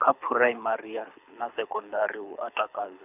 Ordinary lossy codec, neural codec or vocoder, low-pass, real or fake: none; codec, 24 kHz, 6 kbps, HILCodec; 3.6 kHz; fake